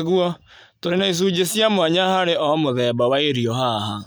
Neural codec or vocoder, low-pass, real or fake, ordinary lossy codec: none; none; real; none